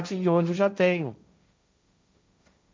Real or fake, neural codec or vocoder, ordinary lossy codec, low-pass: fake; codec, 16 kHz, 1.1 kbps, Voila-Tokenizer; none; none